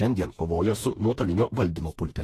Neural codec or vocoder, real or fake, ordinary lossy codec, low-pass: autoencoder, 48 kHz, 32 numbers a frame, DAC-VAE, trained on Japanese speech; fake; AAC, 48 kbps; 14.4 kHz